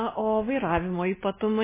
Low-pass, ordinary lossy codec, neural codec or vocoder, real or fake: 3.6 kHz; MP3, 16 kbps; none; real